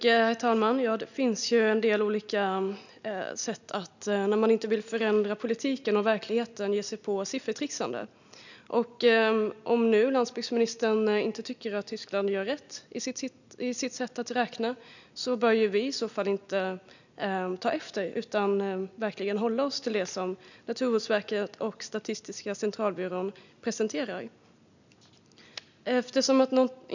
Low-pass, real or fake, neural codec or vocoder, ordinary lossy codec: 7.2 kHz; real; none; none